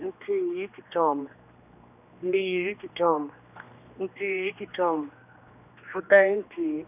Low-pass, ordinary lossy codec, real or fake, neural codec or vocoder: 3.6 kHz; none; fake; codec, 16 kHz, 2 kbps, X-Codec, HuBERT features, trained on general audio